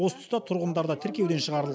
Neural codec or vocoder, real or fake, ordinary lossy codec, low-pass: none; real; none; none